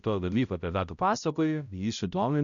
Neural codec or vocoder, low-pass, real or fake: codec, 16 kHz, 0.5 kbps, X-Codec, HuBERT features, trained on balanced general audio; 7.2 kHz; fake